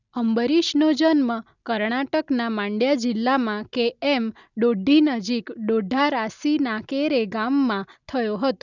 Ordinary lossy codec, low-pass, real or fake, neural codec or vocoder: none; 7.2 kHz; real; none